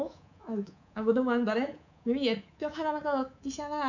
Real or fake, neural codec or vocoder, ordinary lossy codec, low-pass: fake; codec, 24 kHz, 3.1 kbps, DualCodec; none; 7.2 kHz